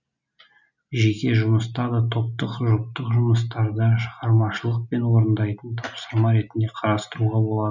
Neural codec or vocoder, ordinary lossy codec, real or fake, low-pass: none; none; real; 7.2 kHz